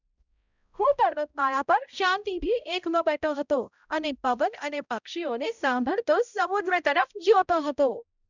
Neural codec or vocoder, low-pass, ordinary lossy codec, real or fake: codec, 16 kHz, 0.5 kbps, X-Codec, HuBERT features, trained on balanced general audio; 7.2 kHz; none; fake